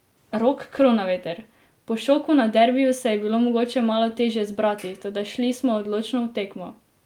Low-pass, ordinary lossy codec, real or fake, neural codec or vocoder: 19.8 kHz; Opus, 32 kbps; real; none